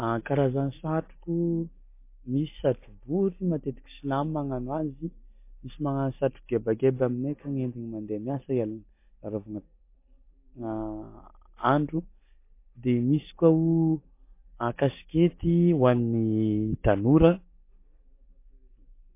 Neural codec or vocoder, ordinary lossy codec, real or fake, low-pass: none; MP3, 24 kbps; real; 3.6 kHz